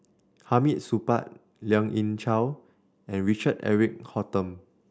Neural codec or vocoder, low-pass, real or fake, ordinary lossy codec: none; none; real; none